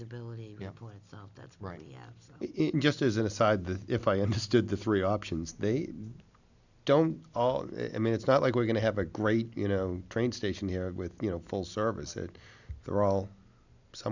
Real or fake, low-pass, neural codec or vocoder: real; 7.2 kHz; none